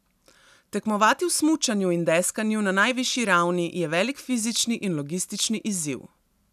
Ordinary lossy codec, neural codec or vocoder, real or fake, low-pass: none; none; real; 14.4 kHz